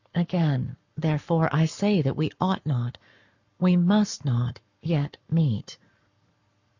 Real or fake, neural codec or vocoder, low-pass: fake; codec, 44.1 kHz, 7.8 kbps, Pupu-Codec; 7.2 kHz